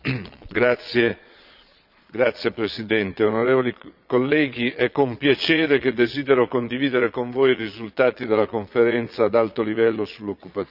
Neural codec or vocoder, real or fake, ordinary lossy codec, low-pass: vocoder, 22.05 kHz, 80 mel bands, Vocos; fake; none; 5.4 kHz